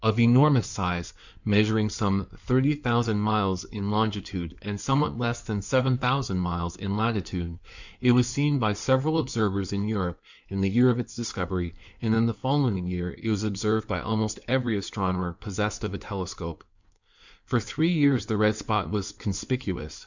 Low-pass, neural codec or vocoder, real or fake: 7.2 kHz; codec, 16 kHz in and 24 kHz out, 2.2 kbps, FireRedTTS-2 codec; fake